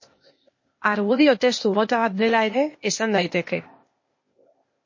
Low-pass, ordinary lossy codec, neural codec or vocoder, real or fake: 7.2 kHz; MP3, 32 kbps; codec, 16 kHz, 0.8 kbps, ZipCodec; fake